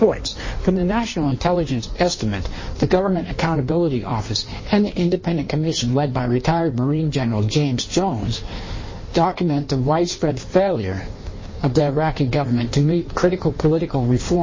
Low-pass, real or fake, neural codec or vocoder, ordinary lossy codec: 7.2 kHz; fake; codec, 16 kHz in and 24 kHz out, 1.1 kbps, FireRedTTS-2 codec; MP3, 32 kbps